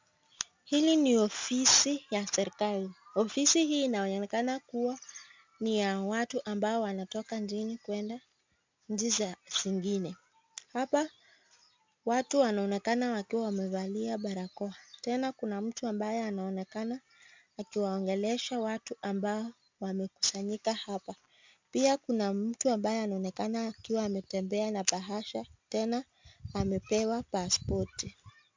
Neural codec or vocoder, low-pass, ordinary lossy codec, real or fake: none; 7.2 kHz; MP3, 64 kbps; real